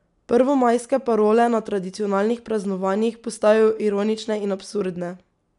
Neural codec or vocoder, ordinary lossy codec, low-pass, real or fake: none; none; 10.8 kHz; real